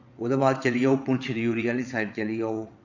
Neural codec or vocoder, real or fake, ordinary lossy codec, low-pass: vocoder, 22.05 kHz, 80 mel bands, WaveNeXt; fake; none; 7.2 kHz